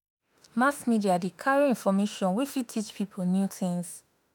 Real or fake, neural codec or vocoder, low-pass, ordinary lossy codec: fake; autoencoder, 48 kHz, 32 numbers a frame, DAC-VAE, trained on Japanese speech; none; none